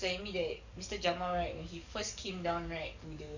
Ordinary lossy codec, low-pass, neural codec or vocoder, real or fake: none; 7.2 kHz; codec, 44.1 kHz, 7.8 kbps, DAC; fake